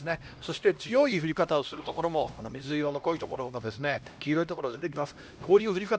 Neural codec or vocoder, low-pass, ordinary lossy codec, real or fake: codec, 16 kHz, 1 kbps, X-Codec, HuBERT features, trained on LibriSpeech; none; none; fake